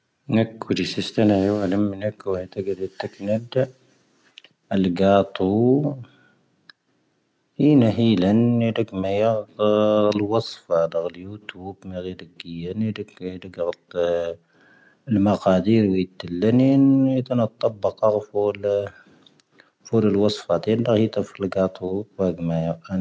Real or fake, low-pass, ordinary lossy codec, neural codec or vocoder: real; none; none; none